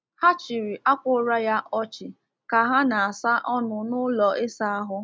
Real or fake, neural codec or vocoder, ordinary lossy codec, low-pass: real; none; none; none